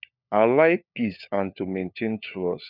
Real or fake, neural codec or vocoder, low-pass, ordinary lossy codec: fake; codec, 16 kHz, 4 kbps, FunCodec, trained on LibriTTS, 50 frames a second; 5.4 kHz; none